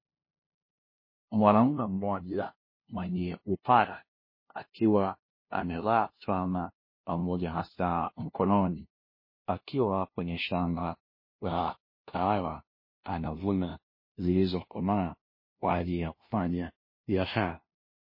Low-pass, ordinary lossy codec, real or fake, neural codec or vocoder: 5.4 kHz; MP3, 24 kbps; fake; codec, 16 kHz, 0.5 kbps, FunCodec, trained on LibriTTS, 25 frames a second